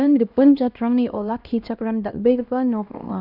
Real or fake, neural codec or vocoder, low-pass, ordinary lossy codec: fake; codec, 16 kHz, 1 kbps, X-Codec, HuBERT features, trained on LibriSpeech; 5.4 kHz; none